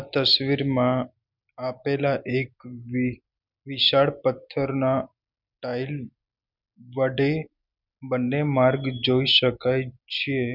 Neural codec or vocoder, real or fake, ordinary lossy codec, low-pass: none; real; none; 5.4 kHz